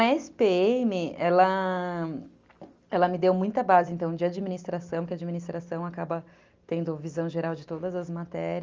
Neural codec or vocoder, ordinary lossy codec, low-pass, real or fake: none; Opus, 32 kbps; 7.2 kHz; real